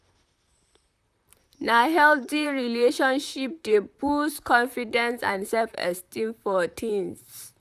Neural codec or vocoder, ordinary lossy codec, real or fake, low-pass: vocoder, 44.1 kHz, 128 mel bands, Pupu-Vocoder; none; fake; 14.4 kHz